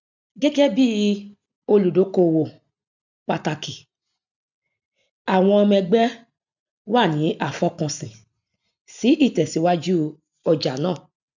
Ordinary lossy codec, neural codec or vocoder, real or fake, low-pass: none; none; real; 7.2 kHz